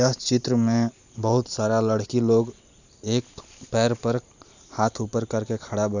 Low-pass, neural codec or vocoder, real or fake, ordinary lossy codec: 7.2 kHz; vocoder, 44.1 kHz, 128 mel bands every 512 samples, BigVGAN v2; fake; none